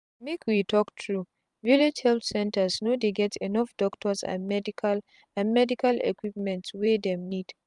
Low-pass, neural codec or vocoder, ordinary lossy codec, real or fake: 10.8 kHz; vocoder, 44.1 kHz, 128 mel bands every 512 samples, BigVGAN v2; none; fake